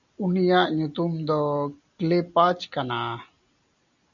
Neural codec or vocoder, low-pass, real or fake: none; 7.2 kHz; real